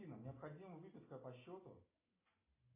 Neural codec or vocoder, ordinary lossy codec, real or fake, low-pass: none; AAC, 24 kbps; real; 3.6 kHz